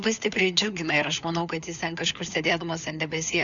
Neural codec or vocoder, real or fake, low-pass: codec, 16 kHz, 4 kbps, FunCodec, trained on LibriTTS, 50 frames a second; fake; 7.2 kHz